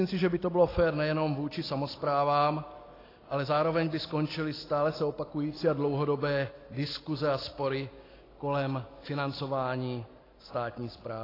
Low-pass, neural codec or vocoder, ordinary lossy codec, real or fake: 5.4 kHz; none; AAC, 24 kbps; real